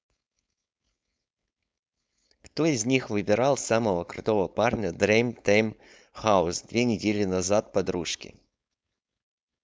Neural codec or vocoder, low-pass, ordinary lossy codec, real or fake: codec, 16 kHz, 4.8 kbps, FACodec; none; none; fake